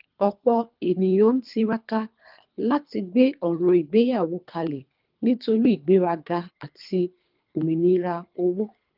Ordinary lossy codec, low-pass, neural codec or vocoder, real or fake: Opus, 32 kbps; 5.4 kHz; codec, 24 kHz, 3 kbps, HILCodec; fake